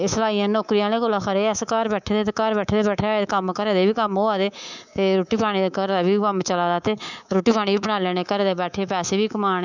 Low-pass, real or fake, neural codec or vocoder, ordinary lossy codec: 7.2 kHz; fake; codec, 24 kHz, 3.1 kbps, DualCodec; none